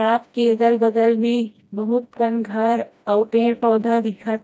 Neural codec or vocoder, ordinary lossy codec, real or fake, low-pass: codec, 16 kHz, 1 kbps, FreqCodec, smaller model; none; fake; none